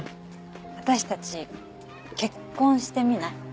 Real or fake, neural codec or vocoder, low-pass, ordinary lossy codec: real; none; none; none